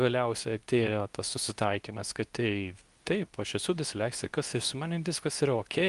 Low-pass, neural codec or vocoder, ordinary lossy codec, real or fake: 10.8 kHz; codec, 24 kHz, 0.9 kbps, WavTokenizer, medium speech release version 2; Opus, 32 kbps; fake